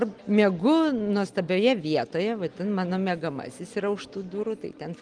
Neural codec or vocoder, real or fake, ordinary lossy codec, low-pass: none; real; Opus, 24 kbps; 9.9 kHz